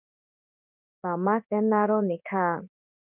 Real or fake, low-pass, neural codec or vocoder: fake; 3.6 kHz; codec, 16 kHz in and 24 kHz out, 1 kbps, XY-Tokenizer